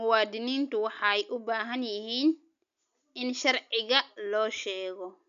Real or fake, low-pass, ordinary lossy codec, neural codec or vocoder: real; 7.2 kHz; none; none